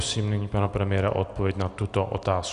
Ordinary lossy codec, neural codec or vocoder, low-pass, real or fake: Opus, 64 kbps; none; 10.8 kHz; real